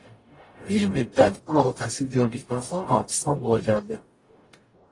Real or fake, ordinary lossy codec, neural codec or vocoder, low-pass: fake; AAC, 32 kbps; codec, 44.1 kHz, 0.9 kbps, DAC; 10.8 kHz